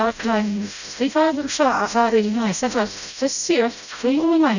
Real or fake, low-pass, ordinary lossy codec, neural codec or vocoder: fake; 7.2 kHz; none; codec, 16 kHz, 0.5 kbps, FreqCodec, smaller model